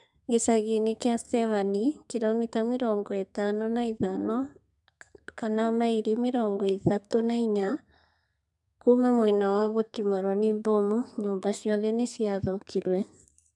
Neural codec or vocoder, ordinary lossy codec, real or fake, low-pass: codec, 32 kHz, 1.9 kbps, SNAC; none; fake; 10.8 kHz